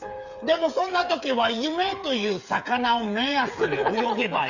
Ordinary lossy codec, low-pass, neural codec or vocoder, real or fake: none; 7.2 kHz; codec, 16 kHz, 8 kbps, FreqCodec, smaller model; fake